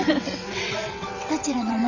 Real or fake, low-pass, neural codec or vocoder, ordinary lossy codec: fake; 7.2 kHz; vocoder, 22.05 kHz, 80 mel bands, WaveNeXt; none